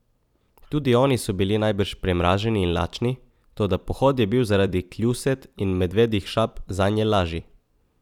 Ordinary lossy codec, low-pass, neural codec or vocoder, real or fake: none; 19.8 kHz; vocoder, 44.1 kHz, 128 mel bands every 256 samples, BigVGAN v2; fake